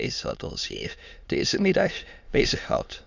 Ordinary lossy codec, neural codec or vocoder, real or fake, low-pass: Opus, 64 kbps; autoencoder, 22.05 kHz, a latent of 192 numbers a frame, VITS, trained on many speakers; fake; 7.2 kHz